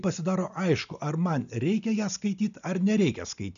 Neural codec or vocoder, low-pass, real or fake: none; 7.2 kHz; real